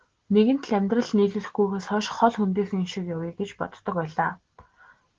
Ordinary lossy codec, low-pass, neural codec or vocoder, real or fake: Opus, 16 kbps; 7.2 kHz; none; real